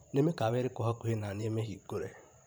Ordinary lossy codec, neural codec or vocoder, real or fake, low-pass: none; vocoder, 44.1 kHz, 128 mel bands every 512 samples, BigVGAN v2; fake; none